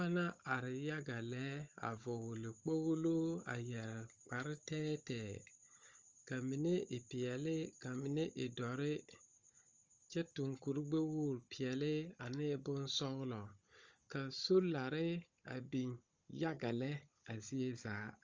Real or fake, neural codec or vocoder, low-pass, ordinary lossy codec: fake; codec, 16 kHz, 16 kbps, FunCodec, trained on Chinese and English, 50 frames a second; 7.2 kHz; Opus, 32 kbps